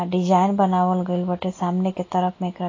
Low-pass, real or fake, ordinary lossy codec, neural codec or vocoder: 7.2 kHz; real; AAC, 32 kbps; none